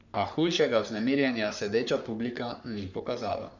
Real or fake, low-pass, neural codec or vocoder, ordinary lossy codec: fake; 7.2 kHz; codec, 44.1 kHz, 3.4 kbps, Pupu-Codec; none